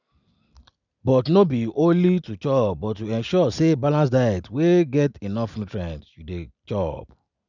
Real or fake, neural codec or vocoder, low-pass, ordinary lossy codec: real; none; 7.2 kHz; none